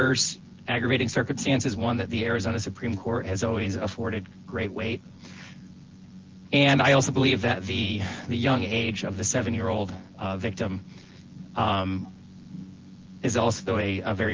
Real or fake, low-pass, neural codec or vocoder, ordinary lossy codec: fake; 7.2 kHz; vocoder, 24 kHz, 100 mel bands, Vocos; Opus, 16 kbps